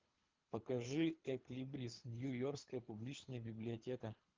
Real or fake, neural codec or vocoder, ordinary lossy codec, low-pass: fake; codec, 24 kHz, 3 kbps, HILCodec; Opus, 32 kbps; 7.2 kHz